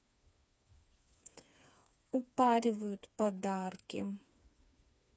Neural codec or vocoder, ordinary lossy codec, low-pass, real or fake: codec, 16 kHz, 4 kbps, FreqCodec, smaller model; none; none; fake